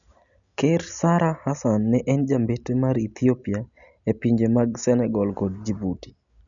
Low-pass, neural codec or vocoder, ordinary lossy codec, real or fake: 7.2 kHz; none; none; real